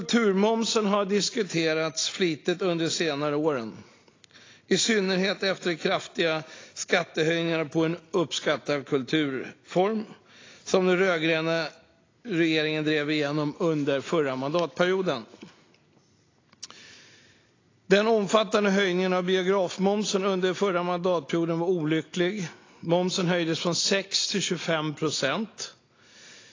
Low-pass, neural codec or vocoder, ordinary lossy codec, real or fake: 7.2 kHz; none; AAC, 32 kbps; real